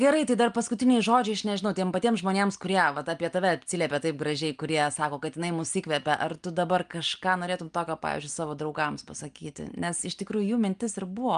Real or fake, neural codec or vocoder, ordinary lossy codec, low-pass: real; none; Opus, 32 kbps; 9.9 kHz